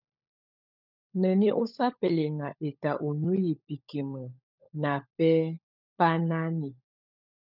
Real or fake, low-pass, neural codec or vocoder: fake; 5.4 kHz; codec, 16 kHz, 16 kbps, FunCodec, trained on LibriTTS, 50 frames a second